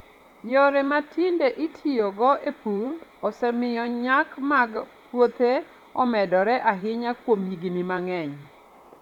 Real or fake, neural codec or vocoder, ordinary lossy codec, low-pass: fake; vocoder, 44.1 kHz, 128 mel bands, Pupu-Vocoder; none; 19.8 kHz